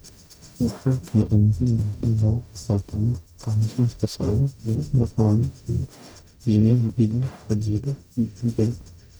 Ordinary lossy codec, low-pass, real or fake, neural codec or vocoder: none; none; fake; codec, 44.1 kHz, 0.9 kbps, DAC